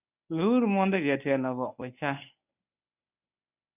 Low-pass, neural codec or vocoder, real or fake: 3.6 kHz; codec, 24 kHz, 0.9 kbps, WavTokenizer, medium speech release version 1; fake